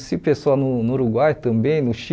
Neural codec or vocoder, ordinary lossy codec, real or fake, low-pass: none; none; real; none